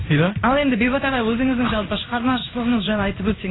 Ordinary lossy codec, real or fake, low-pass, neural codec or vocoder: AAC, 16 kbps; fake; 7.2 kHz; codec, 16 kHz in and 24 kHz out, 1 kbps, XY-Tokenizer